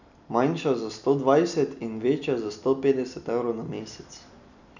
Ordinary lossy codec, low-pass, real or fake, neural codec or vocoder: none; 7.2 kHz; real; none